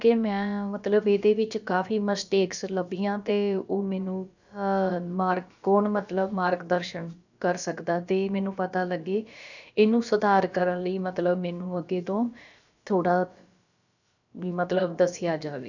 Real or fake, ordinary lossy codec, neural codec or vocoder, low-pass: fake; none; codec, 16 kHz, about 1 kbps, DyCAST, with the encoder's durations; 7.2 kHz